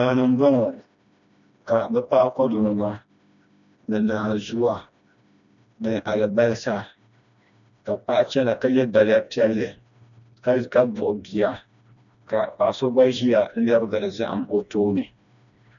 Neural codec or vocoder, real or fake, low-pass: codec, 16 kHz, 1 kbps, FreqCodec, smaller model; fake; 7.2 kHz